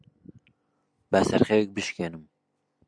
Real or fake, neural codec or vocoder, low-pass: real; none; 9.9 kHz